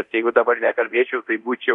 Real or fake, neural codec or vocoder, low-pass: fake; codec, 24 kHz, 0.9 kbps, DualCodec; 10.8 kHz